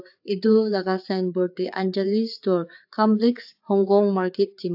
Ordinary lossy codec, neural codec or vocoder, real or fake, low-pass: AAC, 48 kbps; codec, 16 kHz, 4 kbps, FreqCodec, larger model; fake; 5.4 kHz